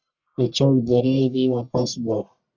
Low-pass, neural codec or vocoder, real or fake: 7.2 kHz; codec, 44.1 kHz, 1.7 kbps, Pupu-Codec; fake